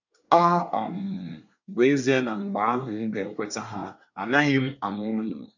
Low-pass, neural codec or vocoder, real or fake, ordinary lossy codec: 7.2 kHz; codec, 24 kHz, 1 kbps, SNAC; fake; none